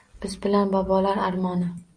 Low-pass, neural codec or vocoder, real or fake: 9.9 kHz; none; real